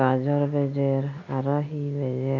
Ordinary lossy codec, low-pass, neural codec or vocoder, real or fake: none; 7.2 kHz; none; real